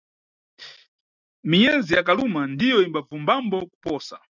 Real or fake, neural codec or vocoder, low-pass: real; none; 7.2 kHz